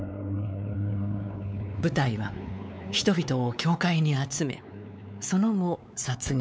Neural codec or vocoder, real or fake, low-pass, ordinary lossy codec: codec, 16 kHz, 4 kbps, X-Codec, WavLM features, trained on Multilingual LibriSpeech; fake; none; none